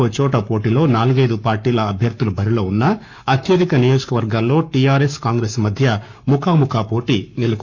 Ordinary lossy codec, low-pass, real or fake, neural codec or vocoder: none; 7.2 kHz; fake; codec, 44.1 kHz, 7.8 kbps, Pupu-Codec